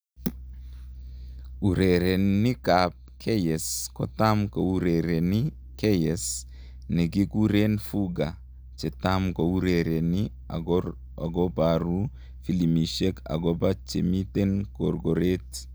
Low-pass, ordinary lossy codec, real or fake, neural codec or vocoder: none; none; real; none